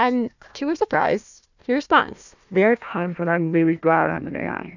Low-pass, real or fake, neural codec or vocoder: 7.2 kHz; fake; codec, 16 kHz, 1 kbps, FunCodec, trained on Chinese and English, 50 frames a second